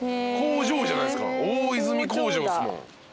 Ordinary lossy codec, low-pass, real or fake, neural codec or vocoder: none; none; real; none